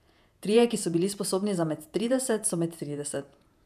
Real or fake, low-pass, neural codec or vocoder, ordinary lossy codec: fake; 14.4 kHz; vocoder, 48 kHz, 128 mel bands, Vocos; none